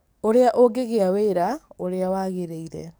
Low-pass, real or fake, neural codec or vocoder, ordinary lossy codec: none; fake; codec, 44.1 kHz, 7.8 kbps, DAC; none